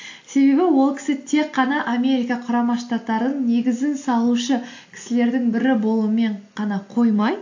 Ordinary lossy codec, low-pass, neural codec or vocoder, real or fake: AAC, 48 kbps; 7.2 kHz; none; real